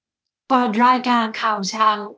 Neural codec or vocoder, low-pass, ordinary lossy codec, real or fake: codec, 16 kHz, 0.8 kbps, ZipCodec; none; none; fake